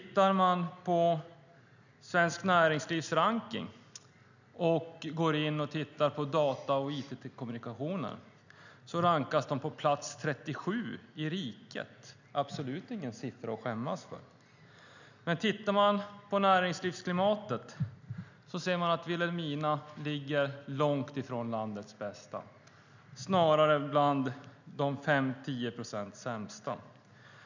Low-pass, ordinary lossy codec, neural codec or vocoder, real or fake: 7.2 kHz; none; none; real